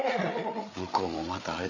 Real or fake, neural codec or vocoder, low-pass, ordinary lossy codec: fake; codec, 16 kHz, 8 kbps, FreqCodec, larger model; 7.2 kHz; none